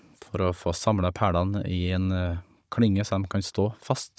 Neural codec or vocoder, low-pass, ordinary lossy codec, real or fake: codec, 16 kHz, 16 kbps, FunCodec, trained on Chinese and English, 50 frames a second; none; none; fake